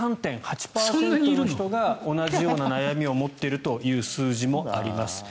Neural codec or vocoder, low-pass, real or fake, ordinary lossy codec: none; none; real; none